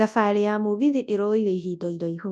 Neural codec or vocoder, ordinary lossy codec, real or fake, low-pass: codec, 24 kHz, 0.9 kbps, WavTokenizer, large speech release; none; fake; none